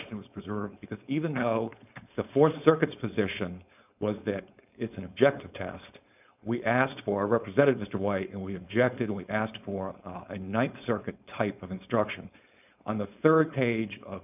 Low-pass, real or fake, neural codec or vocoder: 3.6 kHz; fake; codec, 16 kHz, 4.8 kbps, FACodec